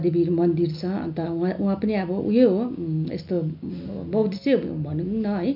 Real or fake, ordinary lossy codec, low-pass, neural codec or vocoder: real; none; 5.4 kHz; none